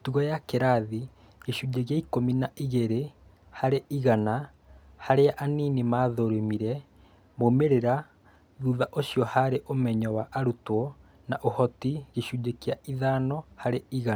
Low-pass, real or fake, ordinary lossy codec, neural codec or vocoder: none; real; none; none